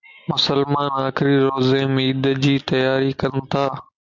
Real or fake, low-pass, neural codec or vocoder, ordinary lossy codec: real; 7.2 kHz; none; MP3, 64 kbps